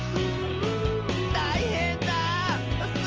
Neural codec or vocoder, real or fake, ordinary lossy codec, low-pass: none; real; Opus, 24 kbps; 7.2 kHz